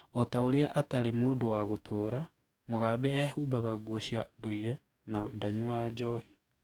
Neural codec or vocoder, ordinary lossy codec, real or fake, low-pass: codec, 44.1 kHz, 2.6 kbps, DAC; none; fake; 19.8 kHz